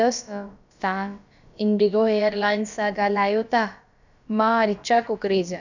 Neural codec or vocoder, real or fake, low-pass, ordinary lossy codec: codec, 16 kHz, about 1 kbps, DyCAST, with the encoder's durations; fake; 7.2 kHz; none